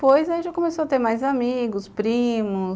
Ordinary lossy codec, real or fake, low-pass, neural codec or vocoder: none; real; none; none